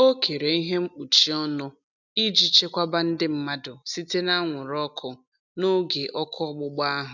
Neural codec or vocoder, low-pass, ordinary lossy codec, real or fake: none; 7.2 kHz; none; real